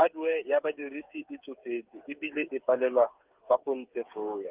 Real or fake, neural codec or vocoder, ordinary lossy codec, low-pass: fake; codec, 16 kHz, 8 kbps, FreqCodec, smaller model; Opus, 32 kbps; 3.6 kHz